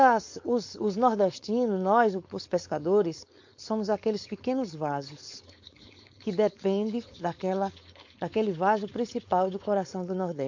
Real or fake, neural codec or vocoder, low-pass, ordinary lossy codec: fake; codec, 16 kHz, 4.8 kbps, FACodec; 7.2 kHz; MP3, 48 kbps